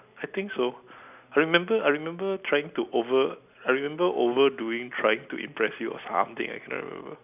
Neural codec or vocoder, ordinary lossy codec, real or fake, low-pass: none; none; real; 3.6 kHz